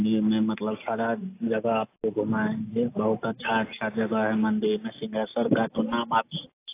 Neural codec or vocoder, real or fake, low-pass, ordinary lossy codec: none; real; 3.6 kHz; AAC, 24 kbps